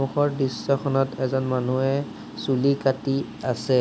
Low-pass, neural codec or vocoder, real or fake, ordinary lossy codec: none; none; real; none